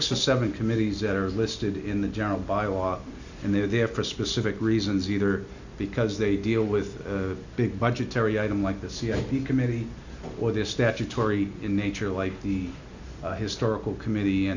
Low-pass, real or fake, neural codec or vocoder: 7.2 kHz; real; none